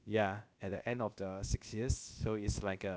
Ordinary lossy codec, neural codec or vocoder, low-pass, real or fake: none; codec, 16 kHz, about 1 kbps, DyCAST, with the encoder's durations; none; fake